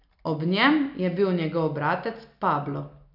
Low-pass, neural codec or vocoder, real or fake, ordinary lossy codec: 5.4 kHz; none; real; none